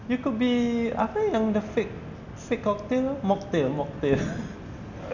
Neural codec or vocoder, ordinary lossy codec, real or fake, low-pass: none; none; real; 7.2 kHz